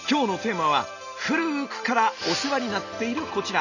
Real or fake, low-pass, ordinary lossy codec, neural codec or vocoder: real; 7.2 kHz; none; none